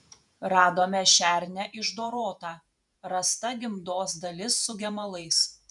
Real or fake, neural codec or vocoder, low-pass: fake; vocoder, 48 kHz, 128 mel bands, Vocos; 10.8 kHz